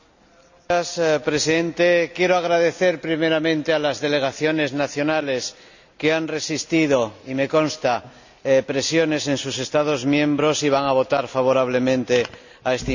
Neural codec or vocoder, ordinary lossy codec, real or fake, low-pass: none; none; real; 7.2 kHz